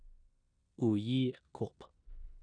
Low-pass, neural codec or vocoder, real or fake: 9.9 kHz; codec, 16 kHz in and 24 kHz out, 0.9 kbps, LongCat-Audio-Codec, four codebook decoder; fake